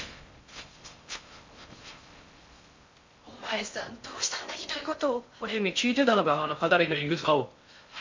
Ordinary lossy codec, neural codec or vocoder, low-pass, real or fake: MP3, 48 kbps; codec, 16 kHz in and 24 kHz out, 0.6 kbps, FocalCodec, streaming, 4096 codes; 7.2 kHz; fake